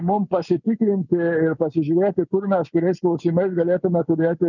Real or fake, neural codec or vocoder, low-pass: real; none; 7.2 kHz